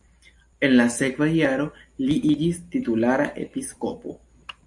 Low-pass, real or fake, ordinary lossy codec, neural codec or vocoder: 10.8 kHz; real; AAC, 64 kbps; none